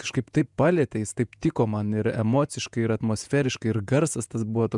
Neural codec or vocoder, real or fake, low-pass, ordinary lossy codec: vocoder, 48 kHz, 128 mel bands, Vocos; fake; 10.8 kHz; MP3, 96 kbps